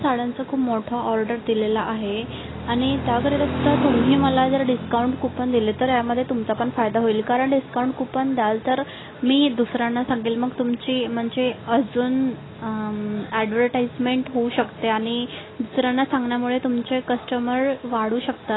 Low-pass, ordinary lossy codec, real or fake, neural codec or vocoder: 7.2 kHz; AAC, 16 kbps; real; none